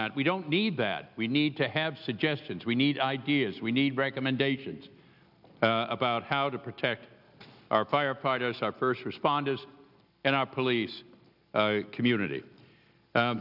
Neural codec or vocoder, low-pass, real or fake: none; 5.4 kHz; real